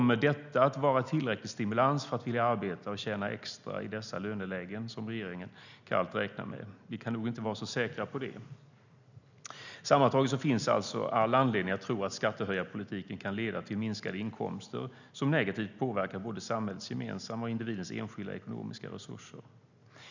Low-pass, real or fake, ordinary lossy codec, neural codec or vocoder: 7.2 kHz; real; none; none